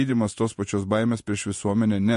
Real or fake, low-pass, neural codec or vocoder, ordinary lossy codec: fake; 14.4 kHz; vocoder, 48 kHz, 128 mel bands, Vocos; MP3, 48 kbps